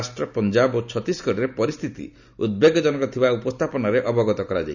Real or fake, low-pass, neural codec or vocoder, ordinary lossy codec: real; 7.2 kHz; none; none